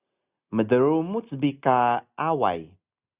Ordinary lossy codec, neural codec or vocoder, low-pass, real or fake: Opus, 64 kbps; none; 3.6 kHz; real